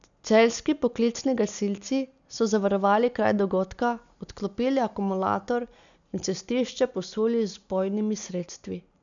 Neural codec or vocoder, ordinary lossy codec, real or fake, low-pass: none; none; real; 7.2 kHz